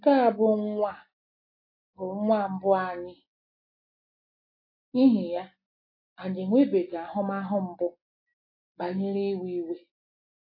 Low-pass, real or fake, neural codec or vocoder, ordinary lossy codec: 5.4 kHz; real; none; AAC, 32 kbps